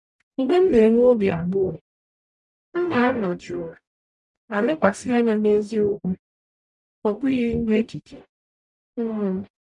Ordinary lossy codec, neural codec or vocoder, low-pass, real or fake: none; codec, 44.1 kHz, 0.9 kbps, DAC; 10.8 kHz; fake